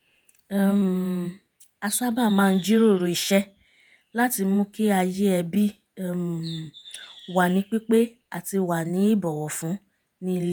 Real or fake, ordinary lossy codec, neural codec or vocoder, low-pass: fake; none; vocoder, 48 kHz, 128 mel bands, Vocos; none